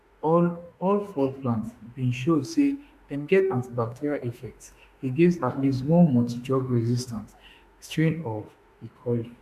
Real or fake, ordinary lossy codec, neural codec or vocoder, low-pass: fake; MP3, 96 kbps; autoencoder, 48 kHz, 32 numbers a frame, DAC-VAE, trained on Japanese speech; 14.4 kHz